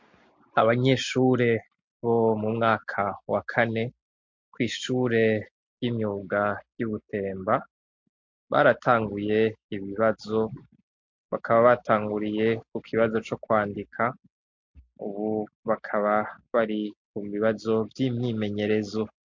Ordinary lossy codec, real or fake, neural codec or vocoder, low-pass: MP3, 48 kbps; real; none; 7.2 kHz